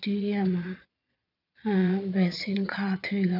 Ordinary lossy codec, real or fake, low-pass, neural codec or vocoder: none; fake; 5.4 kHz; vocoder, 22.05 kHz, 80 mel bands, Vocos